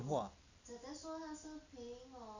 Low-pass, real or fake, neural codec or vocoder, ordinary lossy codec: 7.2 kHz; real; none; none